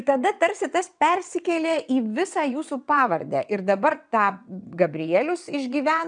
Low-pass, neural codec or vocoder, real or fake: 9.9 kHz; vocoder, 22.05 kHz, 80 mel bands, WaveNeXt; fake